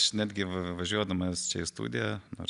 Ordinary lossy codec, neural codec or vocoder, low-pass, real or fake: AAC, 96 kbps; none; 10.8 kHz; real